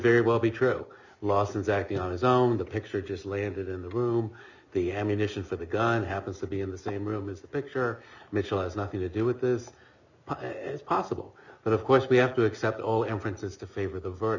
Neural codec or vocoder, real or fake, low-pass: none; real; 7.2 kHz